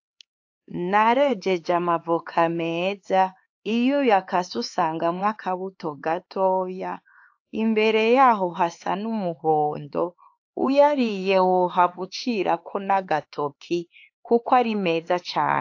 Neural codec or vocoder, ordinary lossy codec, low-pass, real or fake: codec, 16 kHz, 4 kbps, X-Codec, HuBERT features, trained on LibriSpeech; AAC, 48 kbps; 7.2 kHz; fake